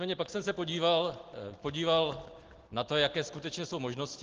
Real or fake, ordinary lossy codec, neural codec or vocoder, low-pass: real; Opus, 16 kbps; none; 7.2 kHz